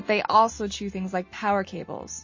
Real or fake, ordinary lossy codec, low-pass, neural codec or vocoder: real; MP3, 32 kbps; 7.2 kHz; none